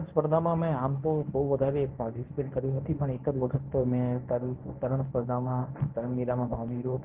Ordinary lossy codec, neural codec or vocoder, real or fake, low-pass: Opus, 16 kbps; codec, 24 kHz, 0.9 kbps, WavTokenizer, medium speech release version 1; fake; 3.6 kHz